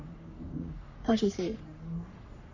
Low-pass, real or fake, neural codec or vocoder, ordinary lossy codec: 7.2 kHz; fake; codec, 44.1 kHz, 3.4 kbps, Pupu-Codec; none